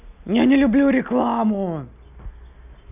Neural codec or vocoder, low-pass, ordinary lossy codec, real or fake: none; 3.6 kHz; none; real